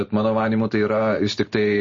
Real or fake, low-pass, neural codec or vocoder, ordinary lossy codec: real; 7.2 kHz; none; MP3, 32 kbps